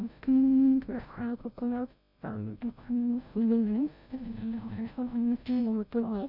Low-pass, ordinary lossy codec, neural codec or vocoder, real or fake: 5.4 kHz; none; codec, 16 kHz, 0.5 kbps, FreqCodec, larger model; fake